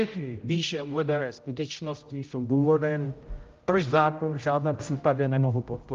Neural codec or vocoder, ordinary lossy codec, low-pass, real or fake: codec, 16 kHz, 0.5 kbps, X-Codec, HuBERT features, trained on general audio; Opus, 32 kbps; 7.2 kHz; fake